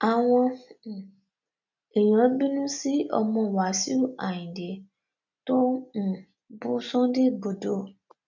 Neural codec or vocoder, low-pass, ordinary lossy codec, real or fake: none; 7.2 kHz; none; real